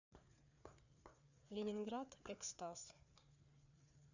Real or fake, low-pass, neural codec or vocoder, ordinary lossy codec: fake; 7.2 kHz; codec, 16 kHz, 4 kbps, FreqCodec, larger model; none